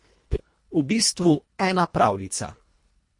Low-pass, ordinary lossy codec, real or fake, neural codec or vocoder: 10.8 kHz; MP3, 48 kbps; fake; codec, 24 kHz, 1.5 kbps, HILCodec